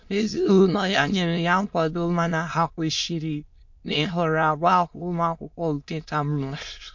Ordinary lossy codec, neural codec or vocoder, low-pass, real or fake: MP3, 48 kbps; autoencoder, 22.05 kHz, a latent of 192 numbers a frame, VITS, trained on many speakers; 7.2 kHz; fake